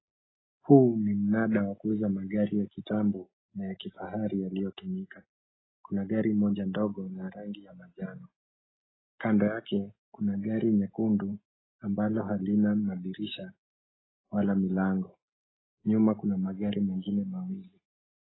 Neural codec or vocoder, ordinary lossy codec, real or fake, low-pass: none; AAC, 16 kbps; real; 7.2 kHz